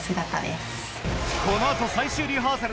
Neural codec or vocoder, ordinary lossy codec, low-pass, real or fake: none; none; none; real